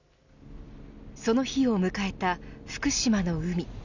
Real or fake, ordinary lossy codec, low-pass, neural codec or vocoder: real; none; 7.2 kHz; none